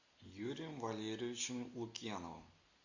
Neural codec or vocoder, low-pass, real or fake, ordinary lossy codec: none; 7.2 kHz; real; AAC, 48 kbps